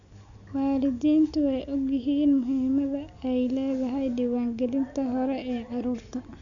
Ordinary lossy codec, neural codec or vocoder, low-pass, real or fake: none; none; 7.2 kHz; real